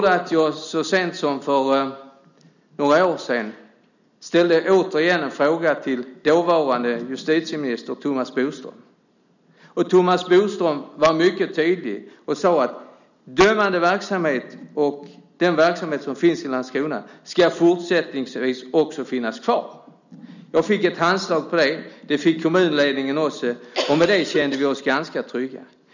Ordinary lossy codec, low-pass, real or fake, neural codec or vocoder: none; 7.2 kHz; real; none